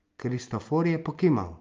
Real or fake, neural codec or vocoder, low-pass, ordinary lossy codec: real; none; 7.2 kHz; Opus, 32 kbps